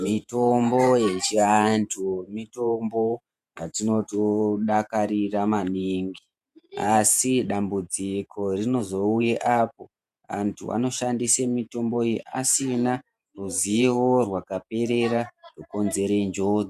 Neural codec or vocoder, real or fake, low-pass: vocoder, 48 kHz, 128 mel bands, Vocos; fake; 14.4 kHz